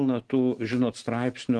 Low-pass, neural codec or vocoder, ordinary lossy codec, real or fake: 10.8 kHz; none; Opus, 16 kbps; real